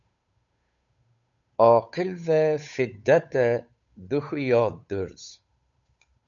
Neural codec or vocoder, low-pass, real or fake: codec, 16 kHz, 8 kbps, FunCodec, trained on Chinese and English, 25 frames a second; 7.2 kHz; fake